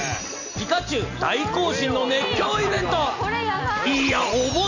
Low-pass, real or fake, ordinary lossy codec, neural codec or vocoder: 7.2 kHz; real; none; none